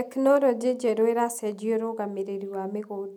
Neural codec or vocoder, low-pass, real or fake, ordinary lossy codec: none; 19.8 kHz; real; none